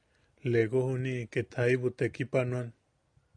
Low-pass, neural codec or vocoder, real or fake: 9.9 kHz; none; real